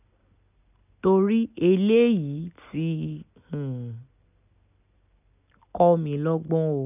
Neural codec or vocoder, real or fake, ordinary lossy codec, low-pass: none; real; none; 3.6 kHz